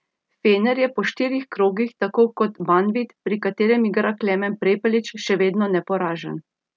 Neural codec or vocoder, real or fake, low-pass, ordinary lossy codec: none; real; none; none